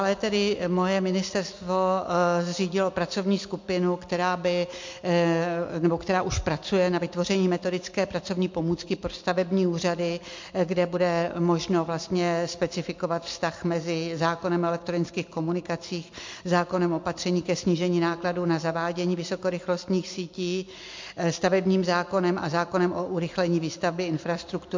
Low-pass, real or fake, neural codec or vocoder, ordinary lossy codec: 7.2 kHz; real; none; MP3, 48 kbps